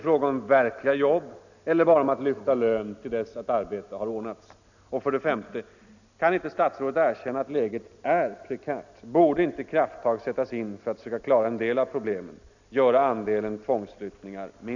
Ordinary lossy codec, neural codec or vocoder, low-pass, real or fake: none; none; 7.2 kHz; real